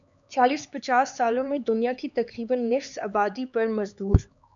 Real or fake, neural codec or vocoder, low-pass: fake; codec, 16 kHz, 4 kbps, X-Codec, HuBERT features, trained on LibriSpeech; 7.2 kHz